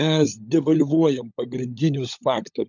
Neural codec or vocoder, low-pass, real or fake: codec, 16 kHz, 16 kbps, FunCodec, trained on LibriTTS, 50 frames a second; 7.2 kHz; fake